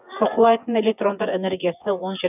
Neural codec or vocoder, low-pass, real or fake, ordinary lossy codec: vocoder, 24 kHz, 100 mel bands, Vocos; 3.6 kHz; fake; none